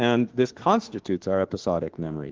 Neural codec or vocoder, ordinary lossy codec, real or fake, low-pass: autoencoder, 48 kHz, 32 numbers a frame, DAC-VAE, trained on Japanese speech; Opus, 16 kbps; fake; 7.2 kHz